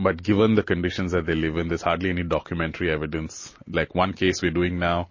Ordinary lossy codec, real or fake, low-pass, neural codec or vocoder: MP3, 32 kbps; real; 7.2 kHz; none